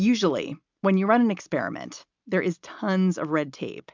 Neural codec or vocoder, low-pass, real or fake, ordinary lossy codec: none; 7.2 kHz; real; MP3, 64 kbps